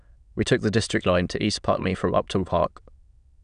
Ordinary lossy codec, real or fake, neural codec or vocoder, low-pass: none; fake; autoencoder, 22.05 kHz, a latent of 192 numbers a frame, VITS, trained on many speakers; 9.9 kHz